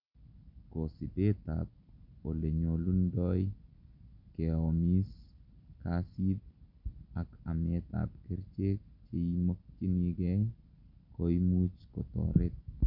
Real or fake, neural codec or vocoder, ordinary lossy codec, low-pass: real; none; none; 5.4 kHz